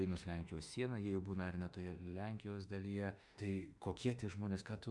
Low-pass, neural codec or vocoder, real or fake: 10.8 kHz; autoencoder, 48 kHz, 32 numbers a frame, DAC-VAE, trained on Japanese speech; fake